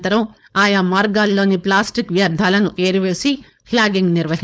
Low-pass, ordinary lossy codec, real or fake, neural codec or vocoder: none; none; fake; codec, 16 kHz, 4.8 kbps, FACodec